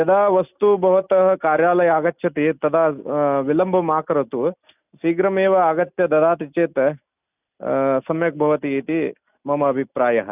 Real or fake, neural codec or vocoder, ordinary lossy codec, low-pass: real; none; none; 3.6 kHz